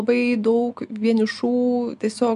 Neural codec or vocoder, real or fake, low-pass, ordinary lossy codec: none; real; 10.8 kHz; MP3, 96 kbps